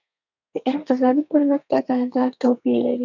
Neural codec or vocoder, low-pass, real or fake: codec, 32 kHz, 1.9 kbps, SNAC; 7.2 kHz; fake